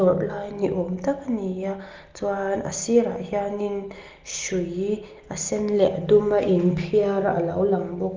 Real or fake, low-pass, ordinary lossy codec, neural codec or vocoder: real; 7.2 kHz; Opus, 24 kbps; none